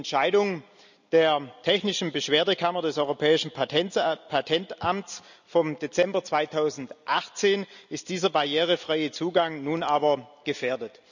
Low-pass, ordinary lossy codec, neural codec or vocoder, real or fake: 7.2 kHz; none; none; real